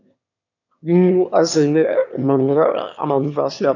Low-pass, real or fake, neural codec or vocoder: 7.2 kHz; fake; autoencoder, 22.05 kHz, a latent of 192 numbers a frame, VITS, trained on one speaker